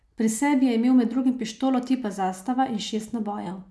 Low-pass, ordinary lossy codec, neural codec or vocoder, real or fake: none; none; none; real